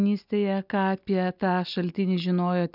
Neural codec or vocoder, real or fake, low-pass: none; real; 5.4 kHz